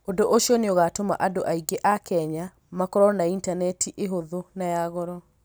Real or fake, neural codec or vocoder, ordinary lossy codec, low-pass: real; none; none; none